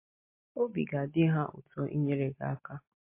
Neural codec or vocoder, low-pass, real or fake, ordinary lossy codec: none; 3.6 kHz; real; MP3, 24 kbps